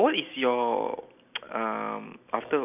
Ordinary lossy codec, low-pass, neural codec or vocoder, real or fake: none; 3.6 kHz; none; real